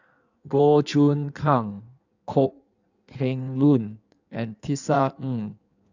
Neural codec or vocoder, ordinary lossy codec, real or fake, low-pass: codec, 16 kHz in and 24 kHz out, 1.1 kbps, FireRedTTS-2 codec; none; fake; 7.2 kHz